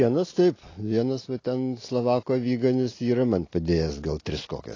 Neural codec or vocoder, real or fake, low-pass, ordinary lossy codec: none; real; 7.2 kHz; AAC, 32 kbps